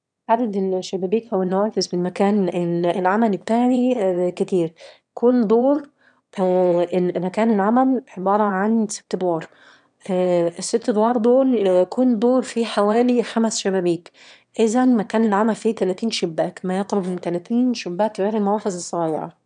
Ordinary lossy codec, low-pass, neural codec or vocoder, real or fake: none; 9.9 kHz; autoencoder, 22.05 kHz, a latent of 192 numbers a frame, VITS, trained on one speaker; fake